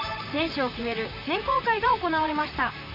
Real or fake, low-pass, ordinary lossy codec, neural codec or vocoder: fake; 5.4 kHz; MP3, 32 kbps; vocoder, 44.1 kHz, 128 mel bands, Pupu-Vocoder